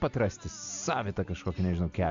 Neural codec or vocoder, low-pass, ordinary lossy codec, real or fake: none; 7.2 kHz; AAC, 64 kbps; real